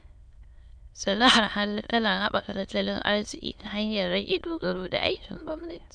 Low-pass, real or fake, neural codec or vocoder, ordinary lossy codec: 9.9 kHz; fake; autoencoder, 22.05 kHz, a latent of 192 numbers a frame, VITS, trained on many speakers; none